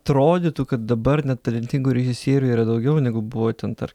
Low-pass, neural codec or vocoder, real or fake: 19.8 kHz; none; real